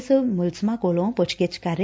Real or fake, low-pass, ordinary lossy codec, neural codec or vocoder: real; none; none; none